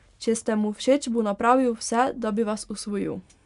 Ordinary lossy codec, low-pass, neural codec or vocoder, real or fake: none; 10.8 kHz; none; real